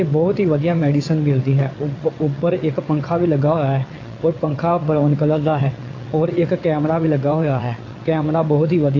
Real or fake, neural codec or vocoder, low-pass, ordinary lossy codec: fake; vocoder, 22.05 kHz, 80 mel bands, WaveNeXt; 7.2 kHz; AAC, 32 kbps